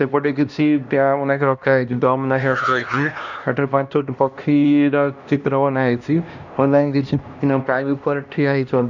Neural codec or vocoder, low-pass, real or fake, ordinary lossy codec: codec, 16 kHz, 1 kbps, X-Codec, HuBERT features, trained on LibriSpeech; 7.2 kHz; fake; none